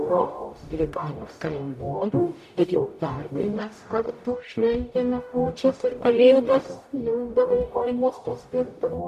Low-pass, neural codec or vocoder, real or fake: 14.4 kHz; codec, 44.1 kHz, 0.9 kbps, DAC; fake